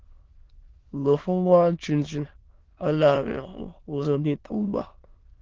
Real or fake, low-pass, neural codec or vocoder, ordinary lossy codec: fake; 7.2 kHz; autoencoder, 22.05 kHz, a latent of 192 numbers a frame, VITS, trained on many speakers; Opus, 16 kbps